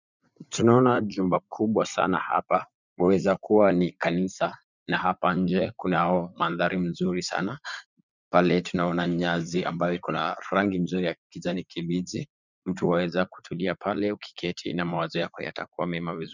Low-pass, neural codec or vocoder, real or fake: 7.2 kHz; vocoder, 44.1 kHz, 80 mel bands, Vocos; fake